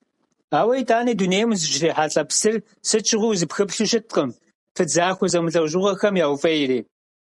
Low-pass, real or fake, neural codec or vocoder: 10.8 kHz; real; none